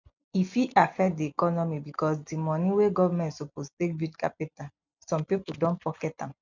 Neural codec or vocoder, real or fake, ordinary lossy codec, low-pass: none; real; none; 7.2 kHz